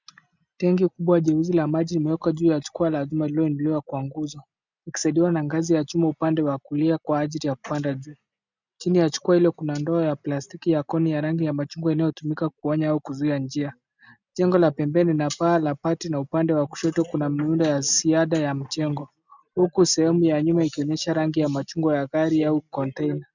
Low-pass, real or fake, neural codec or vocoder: 7.2 kHz; real; none